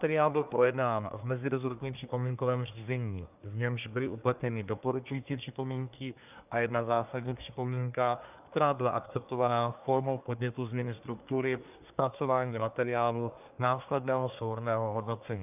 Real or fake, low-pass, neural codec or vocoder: fake; 3.6 kHz; codec, 24 kHz, 1 kbps, SNAC